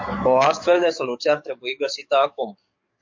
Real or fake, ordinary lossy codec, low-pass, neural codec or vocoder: fake; MP3, 48 kbps; 7.2 kHz; codec, 44.1 kHz, 7.8 kbps, DAC